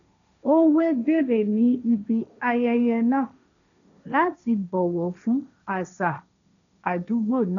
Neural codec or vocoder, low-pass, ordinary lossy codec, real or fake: codec, 16 kHz, 1.1 kbps, Voila-Tokenizer; 7.2 kHz; none; fake